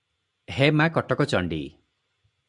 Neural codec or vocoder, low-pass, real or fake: none; 10.8 kHz; real